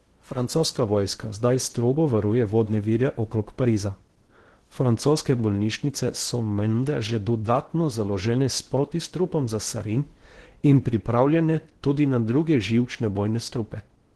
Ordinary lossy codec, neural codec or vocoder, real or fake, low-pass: Opus, 16 kbps; codec, 16 kHz in and 24 kHz out, 0.8 kbps, FocalCodec, streaming, 65536 codes; fake; 10.8 kHz